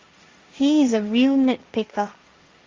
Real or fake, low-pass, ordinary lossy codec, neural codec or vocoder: fake; 7.2 kHz; Opus, 32 kbps; codec, 16 kHz, 1.1 kbps, Voila-Tokenizer